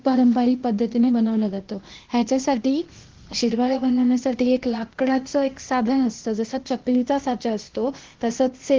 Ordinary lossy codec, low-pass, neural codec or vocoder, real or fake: Opus, 16 kbps; 7.2 kHz; codec, 16 kHz, 0.8 kbps, ZipCodec; fake